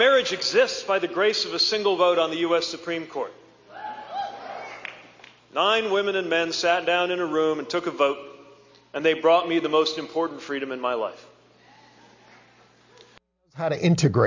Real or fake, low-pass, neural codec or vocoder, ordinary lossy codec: real; 7.2 kHz; none; MP3, 64 kbps